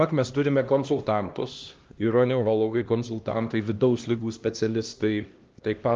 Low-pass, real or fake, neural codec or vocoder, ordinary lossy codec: 7.2 kHz; fake; codec, 16 kHz, 1 kbps, X-Codec, HuBERT features, trained on LibriSpeech; Opus, 32 kbps